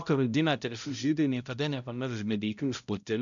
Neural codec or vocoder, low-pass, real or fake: codec, 16 kHz, 0.5 kbps, X-Codec, HuBERT features, trained on balanced general audio; 7.2 kHz; fake